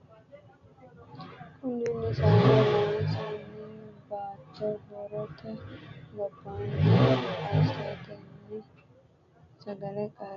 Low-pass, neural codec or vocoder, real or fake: 7.2 kHz; none; real